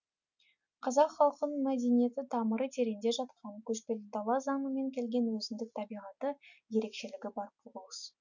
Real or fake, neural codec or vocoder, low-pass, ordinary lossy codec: real; none; 7.2 kHz; none